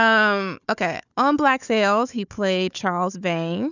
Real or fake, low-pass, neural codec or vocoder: fake; 7.2 kHz; codec, 16 kHz, 4 kbps, FunCodec, trained on Chinese and English, 50 frames a second